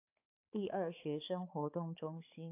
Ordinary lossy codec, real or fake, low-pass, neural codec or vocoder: AAC, 32 kbps; fake; 3.6 kHz; codec, 16 kHz, 4 kbps, X-Codec, HuBERT features, trained on balanced general audio